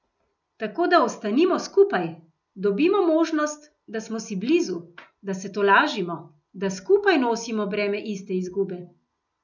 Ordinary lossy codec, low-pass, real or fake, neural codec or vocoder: none; 7.2 kHz; real; none